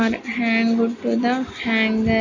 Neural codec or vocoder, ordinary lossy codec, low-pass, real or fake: none; AAC, 48 kbps; 7.2 kHz; real